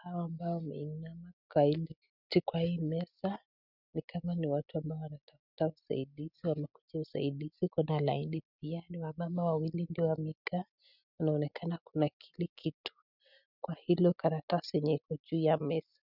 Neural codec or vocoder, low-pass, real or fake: none; 5.4 kHz; real